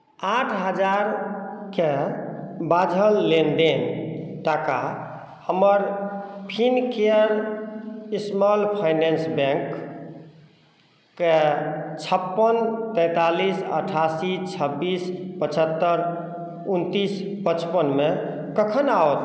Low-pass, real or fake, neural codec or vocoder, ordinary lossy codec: none; real; none; none